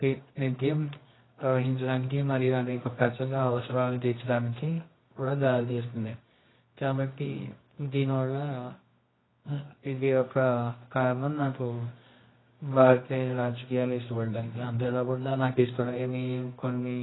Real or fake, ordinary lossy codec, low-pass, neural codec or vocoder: fake; AAC, 16 kbps; 7.2 kHz; codec, 24 kHz, 0.9 kbps, WavTokenizer, medium music audio release